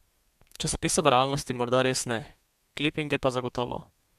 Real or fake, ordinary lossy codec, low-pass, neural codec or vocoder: fake; MP3, 96 kbps; 14.4 kHz; codec, 32 kHz, 1.9 kbps, SNAC